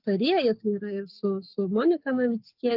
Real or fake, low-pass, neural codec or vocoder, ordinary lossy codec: fake; 5.4 kHz; codec, 44.1 kHz, 7.8 kbps, Pupu-Codec; Opus, 32 kbps